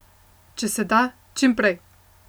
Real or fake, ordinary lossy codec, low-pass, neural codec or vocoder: real; none; none; none